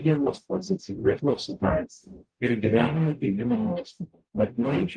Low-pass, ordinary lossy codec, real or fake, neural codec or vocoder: 9.9 kHz; Opus, 24 kbps; fake; codec, 44.1 kHz, 0.9 kbps, DAC